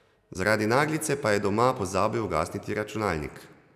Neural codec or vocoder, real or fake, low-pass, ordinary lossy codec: none; real; 14.4 kHz; none